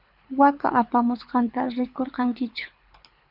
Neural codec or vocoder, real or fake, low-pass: codec, 24 kHz, 6 kbps, HILCodec; fake; 5.4 kHz